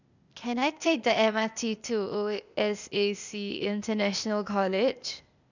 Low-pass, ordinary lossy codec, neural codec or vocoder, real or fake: 7.2 kHz; none; codec, 16 kHz, 0.8 kbps, ZipCodec; fake